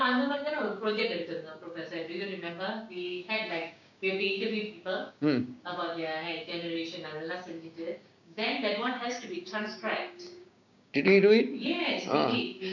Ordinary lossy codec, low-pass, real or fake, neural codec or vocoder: none; 7.2 kHz; fake; codec, 16 kHz, 6 kbps, DAC